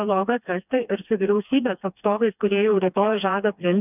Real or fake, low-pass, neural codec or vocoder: fake; 3.6 kHz; codec, 16 kHz, 2 kbps, FreqCodec, smaller model